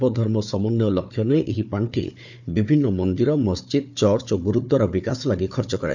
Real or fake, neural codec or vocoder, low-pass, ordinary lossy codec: fake; codec, 16 kHz, 4 kbps, FunCodec, trained on Chinese and English, 50 frames a second; 7.2 kHz; none